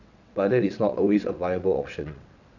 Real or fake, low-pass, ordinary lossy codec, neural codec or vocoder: fake; 7.2 kHz; none; vocoder, 22.05 kHz, 80 mel bands, WaveNeXt